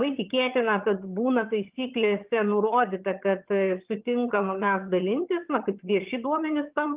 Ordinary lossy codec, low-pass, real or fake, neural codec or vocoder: Opus, 32 kbps; 3.6 kHz; fake; vocoder, 22.05 kHz, 80 mel bands, HiFi-GAN